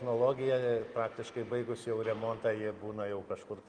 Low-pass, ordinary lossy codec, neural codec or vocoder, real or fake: 9.9 kHz; Opus, 24 kbps; none; real